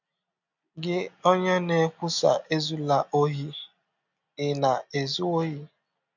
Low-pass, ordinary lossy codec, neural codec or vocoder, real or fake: 7.2 kHz; none; none; real